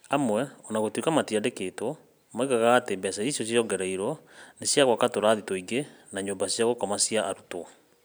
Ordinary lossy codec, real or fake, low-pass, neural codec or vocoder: none; real; none; none